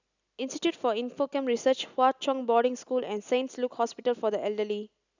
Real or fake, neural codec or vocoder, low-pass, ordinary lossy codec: real; none; 7.2 kHz; none